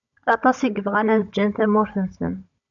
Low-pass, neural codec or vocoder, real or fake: 7.2 kHz; codec, 16 kHz, 16 kbps, FunCodec, trained on Chinese and English, 50 frames a second; fake